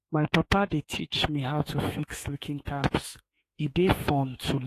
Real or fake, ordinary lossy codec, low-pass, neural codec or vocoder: fake; AAC, 48 kbps; 14.4 kHz; autoencoder, 48 kHz, 32 numbers a frame, DAC-VAE, trained on Japanese speech